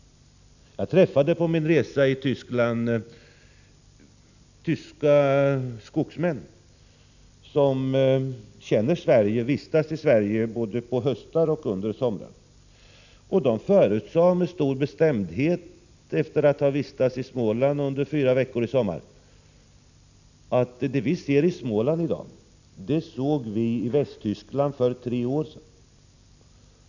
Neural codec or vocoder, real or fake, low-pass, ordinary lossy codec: none; real; 7.2 kHz; none